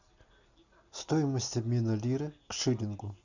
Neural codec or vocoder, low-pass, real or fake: none; 7.2 kHz; real